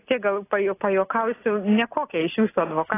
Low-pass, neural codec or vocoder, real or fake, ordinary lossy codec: 3.6 kHz; none; real; AAC, 16 kbps